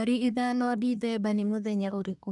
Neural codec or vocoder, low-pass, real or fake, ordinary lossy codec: codec, 24 kHz, 1 kbps, SNAC; 10.8 kHz; fake; none